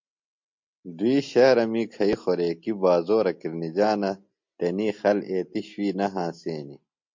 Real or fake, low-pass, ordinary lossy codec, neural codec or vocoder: real; 7.2 kHz; MP3, 48 kbps; none